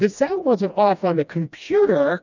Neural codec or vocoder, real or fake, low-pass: codec, 16 kHz, 1 kbps, FreqCodec, smaller model; fake; 7.2 kHz